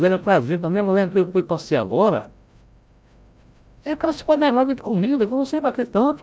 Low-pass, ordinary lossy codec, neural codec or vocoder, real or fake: none; none; codec, 16 kHz, 0.5 kbps, FreqCodec, larger model; fake